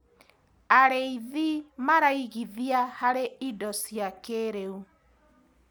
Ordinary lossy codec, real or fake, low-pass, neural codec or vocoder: none; real; none; none